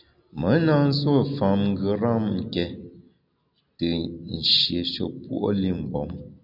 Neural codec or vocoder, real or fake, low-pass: none; real; 5.4 kHz